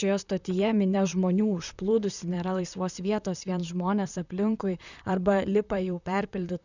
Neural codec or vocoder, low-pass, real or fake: vocoder, 44.1 kHz, 128 mel bands, Pupu-Vocoder; 7.2 kHz; fake